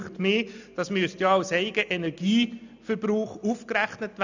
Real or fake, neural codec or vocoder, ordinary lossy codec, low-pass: real; none; none; 7.2 kHz